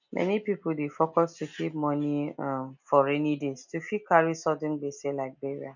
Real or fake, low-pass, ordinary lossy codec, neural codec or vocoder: real; 7.2 kHz; none; none